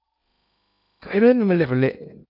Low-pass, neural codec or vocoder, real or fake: 5.4 kHz; codec, 16 kHz in and 24 kHz out, 0.8 kbps, FocalCodec, streaming, 65536 codes; fake